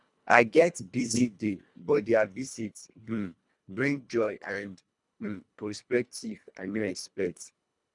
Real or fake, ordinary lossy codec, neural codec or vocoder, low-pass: fake; none; codec, 24 kHz, 1.5 kbps, HILCodec; 10.8 kHz